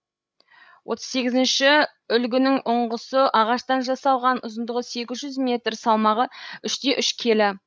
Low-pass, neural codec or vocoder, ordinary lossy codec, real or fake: none; codec, 16 kHz, 16 kbps, FreqCodec, larger model; none; fake